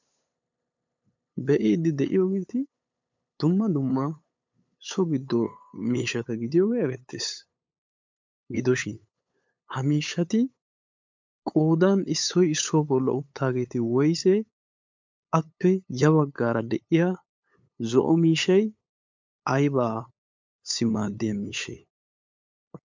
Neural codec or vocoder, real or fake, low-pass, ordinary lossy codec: codec, 16 kHz, 8 kbps, FunCodec, trained on LibriTTS, 25 frames a second; fake; 7.2 kHz; MP3, 64 kbps